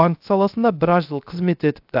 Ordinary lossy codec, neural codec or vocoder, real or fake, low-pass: AAC, 48 kbps; codec, 16 kHz, 0.3 kbps, FocalCodec; fake; 5.4 kHz